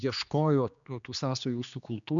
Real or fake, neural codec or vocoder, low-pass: fake; codec, 16 kHz, 2 kbps, X-Codec, HuBERT features, trained on general audio; 7.2 kHz